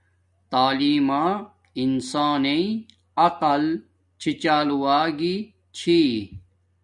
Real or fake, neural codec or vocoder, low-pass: real; none; 10.8 kHz